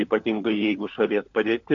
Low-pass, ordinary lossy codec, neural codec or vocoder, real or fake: 7.2 kHz; AAC, 48 kbps; codec, 16 kHz, 4 kbps, FunCodec, trained on LibriTTS, 50 frames a second; fake